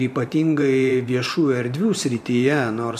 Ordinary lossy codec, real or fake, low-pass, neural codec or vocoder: MP3, 96 kbps; fake; 14.4 kHz; vocoder, 44.1 kHz, 128 mel bands every 512 samples, BigVGAN v2